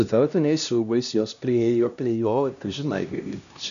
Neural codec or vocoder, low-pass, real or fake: codec, 16 kHz, 1 kbps, X-Codec, HuBERT features, trained on LibriSpeech; 7.2 kHz; fake